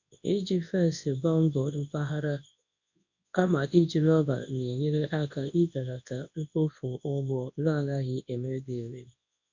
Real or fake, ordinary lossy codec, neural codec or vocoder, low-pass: fake; MP3, 64 kbps; codec, 24 kHz, 0.9 kbps, WavTokenizer, large speech release; 7.2 kHz